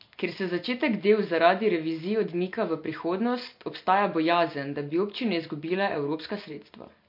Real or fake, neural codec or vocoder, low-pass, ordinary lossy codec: real; none; 5.4 kHz; MP3, 32 kbps